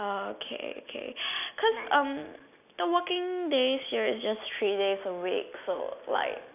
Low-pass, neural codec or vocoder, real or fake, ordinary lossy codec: 3.6 kHz; none; real; none